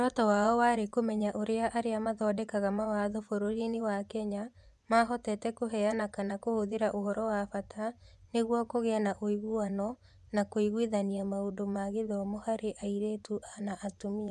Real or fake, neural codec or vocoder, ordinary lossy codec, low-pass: fake; vocoder, 24 kHz, 100 mel bands, Vocos; none; none